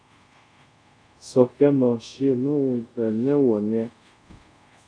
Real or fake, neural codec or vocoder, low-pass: fake; codec, 24 kHz, 0.5 kbps, DualCodec; 9.9 kHz